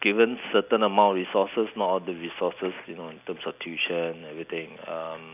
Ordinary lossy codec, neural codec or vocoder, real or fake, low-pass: none; none; real; 3.6 kHz